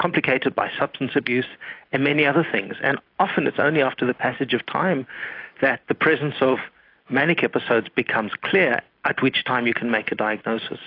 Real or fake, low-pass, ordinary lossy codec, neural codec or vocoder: real; 5.4 kHz; AAC, 32 kbps; none